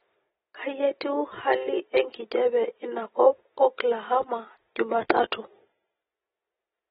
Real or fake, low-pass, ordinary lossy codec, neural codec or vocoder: real; 19.8 kHz; AAC, 16 kbps; none